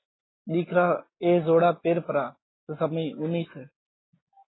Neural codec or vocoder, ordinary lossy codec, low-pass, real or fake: none; AAC, 16 kbps; 7.2 kHz; real